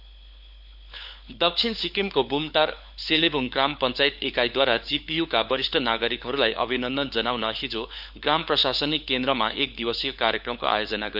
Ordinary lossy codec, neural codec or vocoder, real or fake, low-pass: none; codec, 16 kHz, 4 kbps, FunCodec, trained on LibriTTS, 50 frames a second; fake; 5.4 kHz